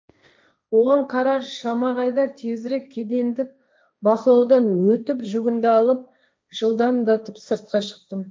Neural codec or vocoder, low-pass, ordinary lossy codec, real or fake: codec, 16 kHz, 1.1 kbps, Voila-Tokenizer; none; none; fake